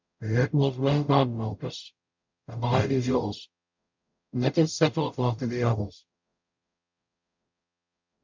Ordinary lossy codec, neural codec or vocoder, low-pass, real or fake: MP3, 64 kbps; codec, 44.1 kHz, 0.9 kbps, DAC; 7.2 kHz; fake